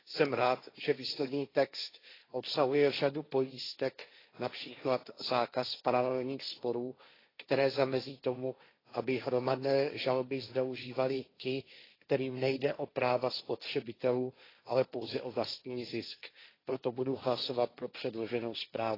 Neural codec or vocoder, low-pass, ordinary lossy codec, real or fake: codec, 16 kHz, 1.1 kbps, Voila-Tokenizer; 5.4 kHz; AAC, 24 kbps; fake